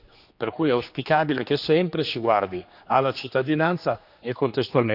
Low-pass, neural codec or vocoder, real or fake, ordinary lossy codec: 5.4 kHz; codec, 16 kHz, 2 kbps, X-Codec, HuBERT features, trained on general audio; fake; none